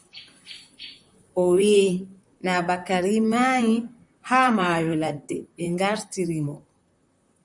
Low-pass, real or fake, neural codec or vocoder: 10.8 kHz; fake; vocoder, 44.1 kHz, 128 mel bands, Pupu-Vocoder